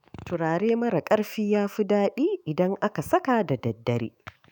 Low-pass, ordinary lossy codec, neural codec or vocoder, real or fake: none; none; autoencoder, 48 kHz, 128 numbers a frame, DAC-VAE, trained on Japanese speech; fake